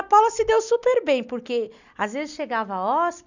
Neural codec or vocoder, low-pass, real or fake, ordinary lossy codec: none; 7.2 kHz; real; none